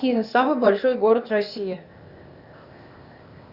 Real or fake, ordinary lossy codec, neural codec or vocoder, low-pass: fake; Opus, 64 kbps; codec, 16 kHz, 0.8 kbps, ZipCodec; 5.4 kHz